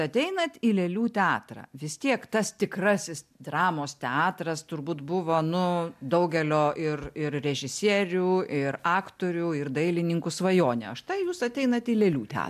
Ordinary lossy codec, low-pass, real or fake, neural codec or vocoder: MP3, 96 kbps; 14.4 kHz; real; none